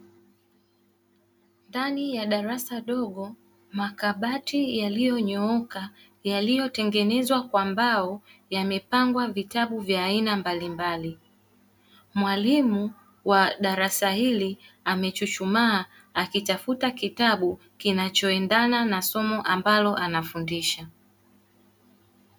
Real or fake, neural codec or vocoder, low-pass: real; none; 19.8 kHz